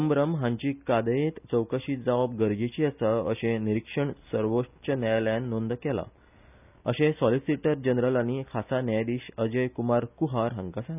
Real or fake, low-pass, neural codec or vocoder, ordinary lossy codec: real; 3.6 kHz; none; none